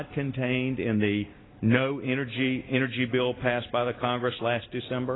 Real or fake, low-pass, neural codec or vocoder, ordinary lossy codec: real; 7.2 kHz; none; AAC, 16 kbps